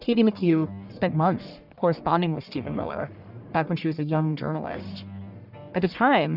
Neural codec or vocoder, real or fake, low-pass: codec, 44.1 kHz, 1.7 kbps, Pupu-Codec; fake; 5.4 kHz